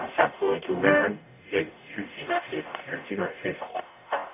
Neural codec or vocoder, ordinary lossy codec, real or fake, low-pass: codec, 44.1 kHz, 0.9 kbps, DAC; none; fake; 3.6 kHz